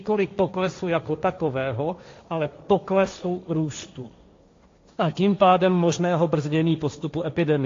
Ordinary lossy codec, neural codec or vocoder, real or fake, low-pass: AAC, 48 kbps; codec, 16 kHz, 1.1 kbps, Voila-Tokenizer; fake; 7.2 kHz